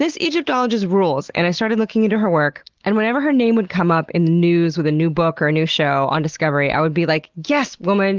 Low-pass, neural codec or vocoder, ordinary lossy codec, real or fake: 7.2 kHz; none; Opus, 16 kbps; real